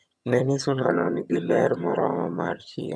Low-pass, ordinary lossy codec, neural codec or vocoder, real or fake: none; none; vocoder, 22.05 kHz, 80 mel bands, HiFi-GAN; fake